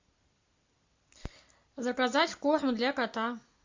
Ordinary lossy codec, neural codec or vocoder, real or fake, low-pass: MP3, 48 kbps; none; real; 7.2 kHz